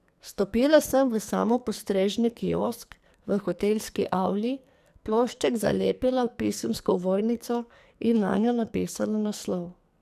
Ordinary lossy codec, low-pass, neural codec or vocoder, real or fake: none; 14.4 kHz; codec, 44.1 kHz, 2.6 kbps, SNAC; fake